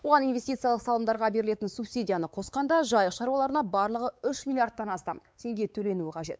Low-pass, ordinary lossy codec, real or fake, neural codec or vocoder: none; none; fake; codec, 16 kHz, 4 kbps, X-Codec, WavLM features, trained on Multilingual LibriSpeech